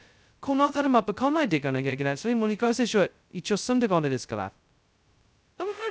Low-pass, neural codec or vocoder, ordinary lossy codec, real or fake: none; codec, 16 kHz, 0.2 kbps, FocalCodec; none; fake